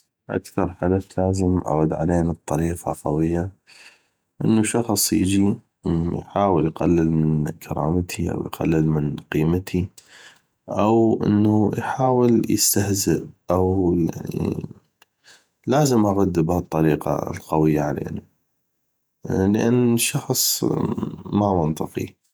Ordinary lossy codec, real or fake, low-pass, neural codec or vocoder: none; fake; none; vocoder, 48 kHz, 128 mel bands, Vocos